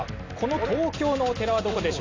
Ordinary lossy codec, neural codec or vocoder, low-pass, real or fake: AAC, 32 kbps; none; 7.2 kHz; real